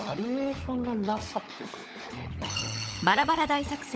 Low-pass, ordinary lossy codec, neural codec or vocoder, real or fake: none; none; codec, 16 kHz, 16 kbps, FunCodec, trained on LibriTTS, 50 frames a second; fake